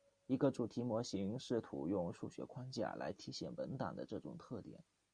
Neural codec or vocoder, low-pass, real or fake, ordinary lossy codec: none; 9.9 kHz; real; Opus, 64 kbps